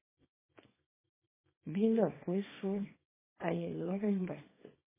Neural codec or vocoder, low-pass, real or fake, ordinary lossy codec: codec, 24 kHz, 0.9 kbps, WavTokenizer, small release; 3.6 kHz; fake; MP3, 16 kbps